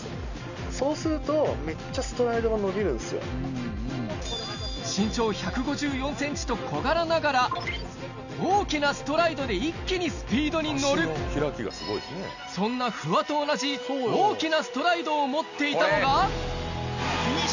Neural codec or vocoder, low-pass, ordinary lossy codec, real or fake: none; 7.2 kHz; none; real